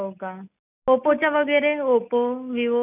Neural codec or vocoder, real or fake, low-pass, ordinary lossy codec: none; real; 3.6 kHz; none